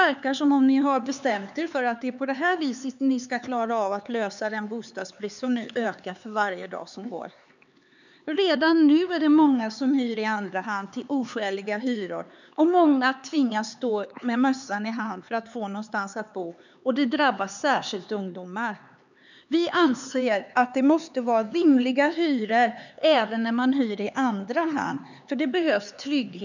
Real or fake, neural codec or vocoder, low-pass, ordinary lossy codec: fake; codec, 16 kHz, 4 kbps, X-Codec, HuBERT features, trained on LibriSpeech; 7.2 kHz; none